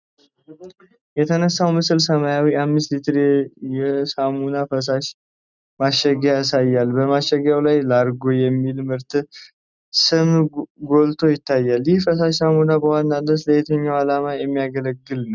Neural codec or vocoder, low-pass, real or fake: none; 7.2 kHz; real